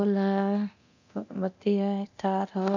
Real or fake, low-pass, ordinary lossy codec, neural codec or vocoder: fake; 7.2 kHz; none; codec, 24 kHz, 0.9 kbps, DualCodec